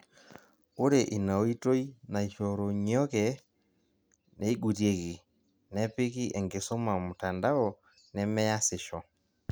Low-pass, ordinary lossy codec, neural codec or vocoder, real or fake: none; none; none; real